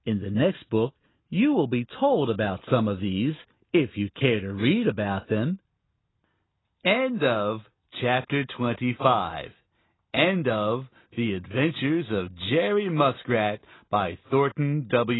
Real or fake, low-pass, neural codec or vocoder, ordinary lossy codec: real; 7.2 kHz; none; AAC, 16 kbps